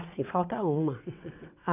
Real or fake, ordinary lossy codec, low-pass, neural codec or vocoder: fake; none; 3.6 kHz; vocoder, 22.05 kHz, 80 mel bands, WaveNeXt